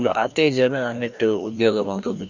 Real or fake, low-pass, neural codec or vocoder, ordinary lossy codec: fake; 7.2 kHz; codec, 16 kHz, 1 kbps, FreqCodec, larger model; none